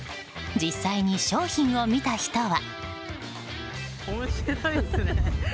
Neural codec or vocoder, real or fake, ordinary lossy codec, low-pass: none; real; none; none